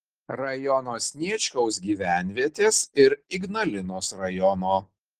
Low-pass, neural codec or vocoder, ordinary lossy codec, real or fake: 14.4 kHz; none; Opus, 16 kbps; real